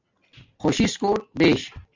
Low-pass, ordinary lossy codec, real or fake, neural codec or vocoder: 7.2 kHz; MP3, 48 kbps; real; none